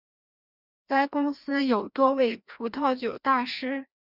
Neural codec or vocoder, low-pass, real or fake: codec, 16 kHz, 1 kbps, FreqCodec, larger model; 5.4 kHz; fake